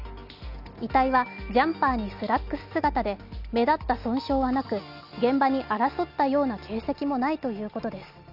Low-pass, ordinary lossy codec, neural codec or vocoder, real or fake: 5.4 kHz; none; none; real